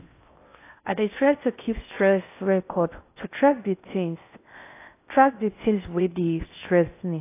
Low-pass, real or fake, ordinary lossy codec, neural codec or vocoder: 3.6 kHz; fake; AAC, 24 kbps; codec, 16 kHz in and 24 kHz out, 0.6 kbps, FocalCodec, streaming, 4096 codes